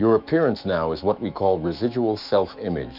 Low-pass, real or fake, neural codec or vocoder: 5.4 kHz; fake; autoencoder, 48 kHz, 128 numbers a frame, DAC-VAE, trained on Japanese speech